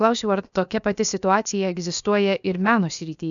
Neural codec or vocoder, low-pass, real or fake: codec, 16 kHz, about 1 kbps, DyCAST, with the encoder's durations; 7.2 kHz; fake